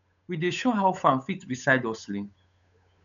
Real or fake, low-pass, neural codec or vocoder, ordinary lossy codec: fake; 7.2 kHz; codec, 16 kHz, 8 kbps, FunCodec, trained on Chinese and English, 25 frames a second; none